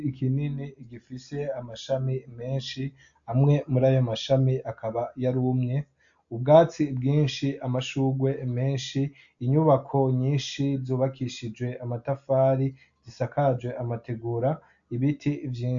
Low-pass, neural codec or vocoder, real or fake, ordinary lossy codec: 7.2 kHz; none; real; AAC, 64 kbps